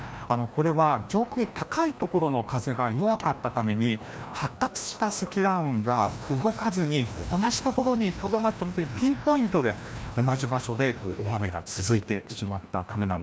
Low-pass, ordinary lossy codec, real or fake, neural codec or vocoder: none; none; fake; codec, 16 kHz, 1 kbps, FreqCodec, larger model